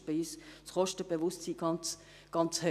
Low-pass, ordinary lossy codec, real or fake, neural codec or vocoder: 14.4 kHz; none; real; none